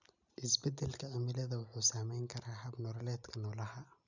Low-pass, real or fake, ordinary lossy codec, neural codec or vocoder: 7.2 kHz; real; none; none